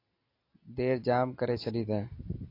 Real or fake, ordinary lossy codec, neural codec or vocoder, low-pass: real; AAC, 32 kbps; none; 5.4 kHz